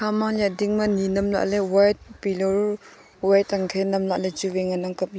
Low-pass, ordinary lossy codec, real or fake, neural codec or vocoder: none; none; real; none